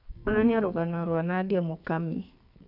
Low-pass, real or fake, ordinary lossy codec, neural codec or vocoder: 5.4 kHz; fake; none; codec, 44.1 kHz, 2.6 kbps, SNAC